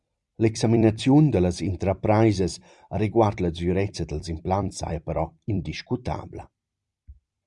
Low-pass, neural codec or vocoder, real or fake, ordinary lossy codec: 10.8 kHz; vocoder, 44.1 kHz, 128 mel bands every 256 samples, BigVGAN v2; fake; Opus, 64 kbps